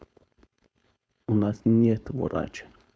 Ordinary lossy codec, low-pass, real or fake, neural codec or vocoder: none; none; fake; codec, 16 kHz, 4.8 kbps, FACodec